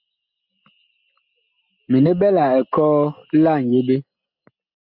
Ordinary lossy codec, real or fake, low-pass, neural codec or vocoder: MP3, 48 kbps; real; 5.4 kHz; none